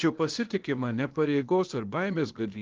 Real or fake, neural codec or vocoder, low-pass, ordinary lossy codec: fake; codec, 16 kHz, 0.8 kbps, ZipCodec; 7.2 kHz; Opus, 32 kbps